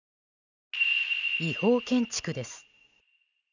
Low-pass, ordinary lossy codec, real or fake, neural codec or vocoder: 7.2 kHz; none; real; none